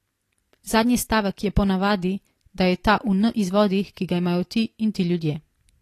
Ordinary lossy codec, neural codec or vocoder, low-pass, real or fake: AAC, 48 kbps; none; 14.4 kHz; real